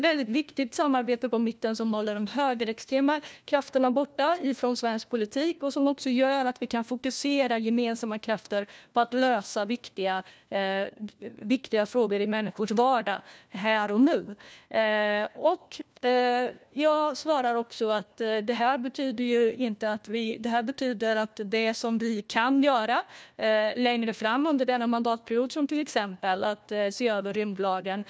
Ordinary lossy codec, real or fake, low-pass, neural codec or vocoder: none; fake; none; codec, 16 kHz, 1 kbps, FunCodec, trained on LibriTTS, 50 frames a second